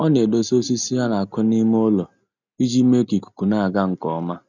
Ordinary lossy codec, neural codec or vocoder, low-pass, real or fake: none; none; 7.2 kHz; real